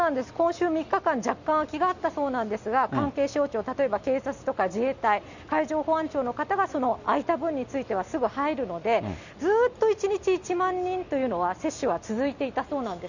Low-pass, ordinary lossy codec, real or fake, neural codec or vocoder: 7.2 kHz; none; real; none